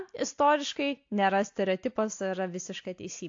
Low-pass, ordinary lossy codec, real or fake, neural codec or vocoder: 7.2 kHz; AAC, 48 kbps; real; none